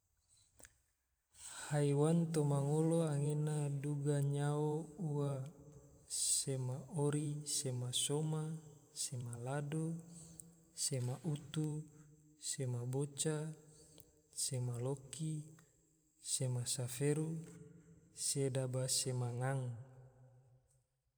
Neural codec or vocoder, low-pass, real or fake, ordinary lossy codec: vocoder, 44.1 kHz, 128 mel bands every 512 samples, BigVGAN v2; none; fake; none